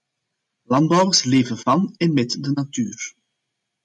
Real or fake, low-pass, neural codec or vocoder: real; 10.8 kHz; none